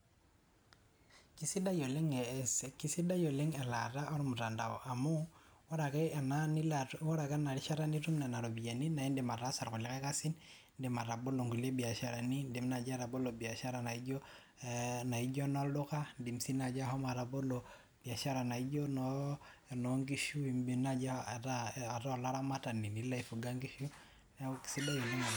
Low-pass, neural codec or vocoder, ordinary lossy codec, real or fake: none; none; none; real